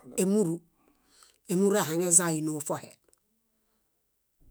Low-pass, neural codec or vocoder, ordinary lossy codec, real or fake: none; autoencoder, 48 kHz, 128 numbers a frame, DAC-VAE, trained on Japanese speech; none; fake